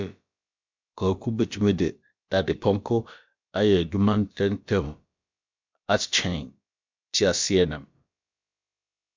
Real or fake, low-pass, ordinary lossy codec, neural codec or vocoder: fake; 7.2 kHz; MP3, 64 kbps; codec, 16 kHz, about 1 kbps, DyCAST, with the encoder's durations